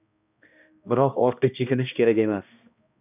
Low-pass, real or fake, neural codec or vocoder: 3.6 kHz; fake; codec, 16 kHz, 1 kbps, X-Codec, HuBERT features, trained on balanced general audio